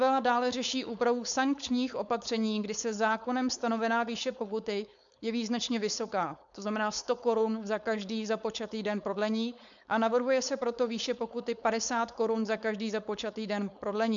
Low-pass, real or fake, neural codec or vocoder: 7.2 kHz; fake; codec, 16 kHz, 4.8 kbps, FACodec